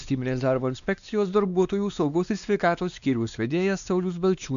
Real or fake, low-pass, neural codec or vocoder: fake; 7.2 kHz; codec, 16 kHz, 2 kbps, X-Codec, WavLM features, trained on Multilingual LibriSpeech